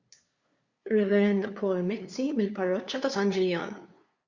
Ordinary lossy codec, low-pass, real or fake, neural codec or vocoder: Opus, 64 kbps; 7.2 kHz; fake; codec, 16 kHz, 2 kbps, FunCodec, trained on LibriTTS, 25 frames a second